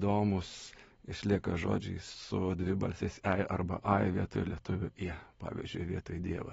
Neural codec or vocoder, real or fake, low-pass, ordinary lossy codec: none; real; 19.8 kHz; AAC, 24 kbps